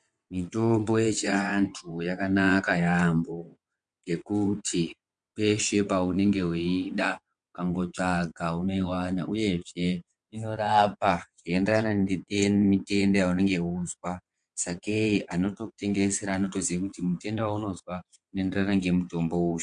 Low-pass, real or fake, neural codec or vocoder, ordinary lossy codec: 9.9 kHz; fake; vocoder, 22.05 kHz, 80 mel bands, WaveNeXt; MP3, 64 kbps